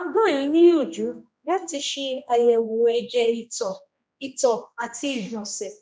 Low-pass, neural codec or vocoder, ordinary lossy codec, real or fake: none; codec, 16 kHz, 1 kbps, X-Codec, HuBERT features, trained on general audio; none; fake